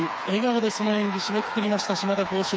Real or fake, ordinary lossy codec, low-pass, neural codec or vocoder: fake; none; none; codec, 16 kHz, 4 kbps, FreqCodec, smaller model